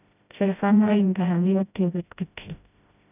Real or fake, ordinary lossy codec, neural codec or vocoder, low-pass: fake; none; codec, 16 kHz, 0.5 kbps, FreqCodec, smaller model; 3.6 kHz